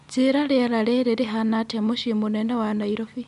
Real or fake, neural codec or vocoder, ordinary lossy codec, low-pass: real; none; none; 10.8 kHz